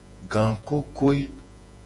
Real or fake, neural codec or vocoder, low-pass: fake; vocoder, 48 kHz, 128 mel bands, Vocos; 10.8 kHz